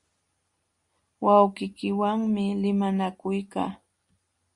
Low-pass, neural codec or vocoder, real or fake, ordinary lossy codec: 10.8 kHz; none; real; Opus, 64 kbps